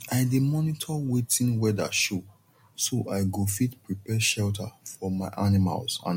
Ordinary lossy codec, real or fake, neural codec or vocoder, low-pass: MP3, 64 kbps; real; none; 19.8 kHz